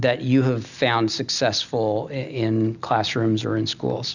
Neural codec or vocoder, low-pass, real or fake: none; 7.2 kHz; real